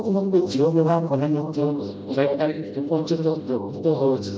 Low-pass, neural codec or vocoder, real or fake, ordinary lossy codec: none; codec, 16 kHz, 0.5 kbps, FreqCodec, smaller model; fake; none